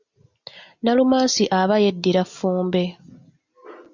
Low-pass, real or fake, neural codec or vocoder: 7.2 kHz; real; none